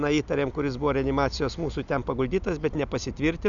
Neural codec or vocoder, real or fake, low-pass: none; real; 7.2 kHz